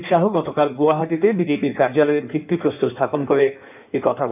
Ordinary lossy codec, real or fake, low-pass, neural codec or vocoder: none; fake; 3.6 kHz; codec, 16 kHz in and 24 kHz out, 1.1 kbps, FireRedTTS-2 codec